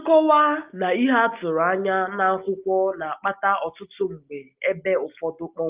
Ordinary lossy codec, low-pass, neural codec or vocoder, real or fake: Opus, 24 kbps; 3.6 kHz; none; real